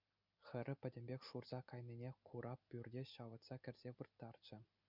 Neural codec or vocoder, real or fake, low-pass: none; real; 5.4 kHz